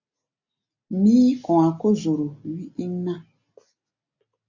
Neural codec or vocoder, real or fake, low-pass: none; real; 7.2 kHz